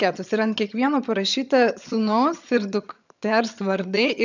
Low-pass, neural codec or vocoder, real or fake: 7.2 kHz; vocoder, 22.05 kHz, 80 mel bands, HiFi-GAN; fake